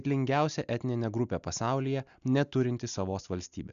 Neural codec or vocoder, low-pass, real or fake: none; 7.2 kHz; real